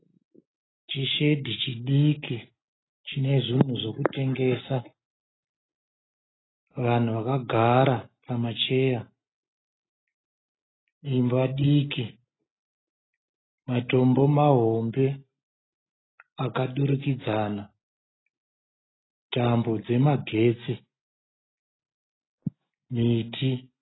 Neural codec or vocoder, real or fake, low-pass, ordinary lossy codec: none; real; 7.2 kHz; AAC, 16 kbps